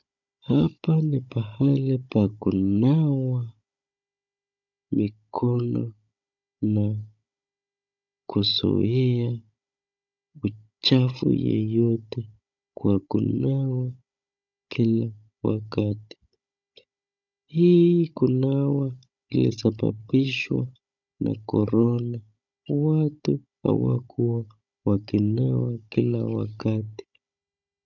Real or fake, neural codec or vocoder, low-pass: fake; codec, 16 kHz, 16 kbps, FunCodec, trained on Chinese and English, 50 frames a second; 7.2 kHz